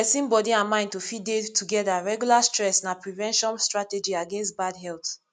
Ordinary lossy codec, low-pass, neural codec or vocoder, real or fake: none; 9.9 kHz; none; real